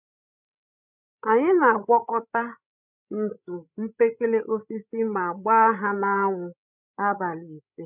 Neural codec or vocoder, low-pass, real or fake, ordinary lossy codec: codec, 16 kHz, 16 kbps, FreqCodec, larger model; 3.6 kHz; fake; none